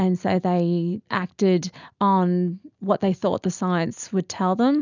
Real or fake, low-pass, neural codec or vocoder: real; 7.2 kHz; none